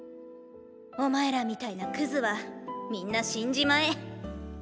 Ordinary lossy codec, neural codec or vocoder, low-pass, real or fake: none; none; none; real